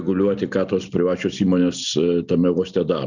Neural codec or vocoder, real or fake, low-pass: none; real; 7.2 kHz